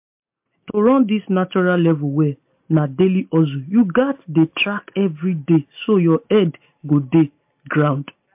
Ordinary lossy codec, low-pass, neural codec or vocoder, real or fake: MP3, 32 kbps; 3.6 kHz; none; real